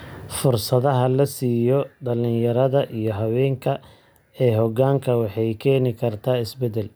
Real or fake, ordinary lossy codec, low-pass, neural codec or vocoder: real; none; none; none